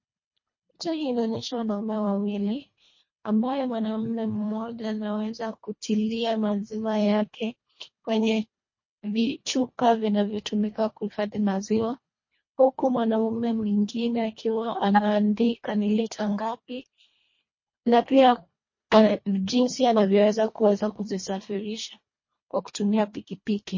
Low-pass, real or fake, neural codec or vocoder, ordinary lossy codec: 7.2 kHz; fake; codec, 24 kHz, 1.5 kbps, HILCodec; MP3, 32 kbps